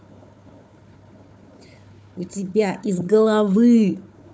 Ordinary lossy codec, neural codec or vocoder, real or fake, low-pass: none; codec, 16 kHz, 4 kbps, FunCodec, trained on Chinese and English, 50 frames a second; fake; none